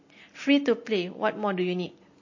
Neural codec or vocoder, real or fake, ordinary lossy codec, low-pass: none; real; MP3, 32 kbps; 7.2 kHz